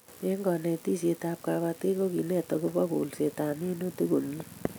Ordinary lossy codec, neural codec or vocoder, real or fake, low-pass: none; none; real; none